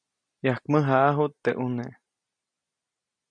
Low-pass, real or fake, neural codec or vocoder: 9.9 kHz; real; none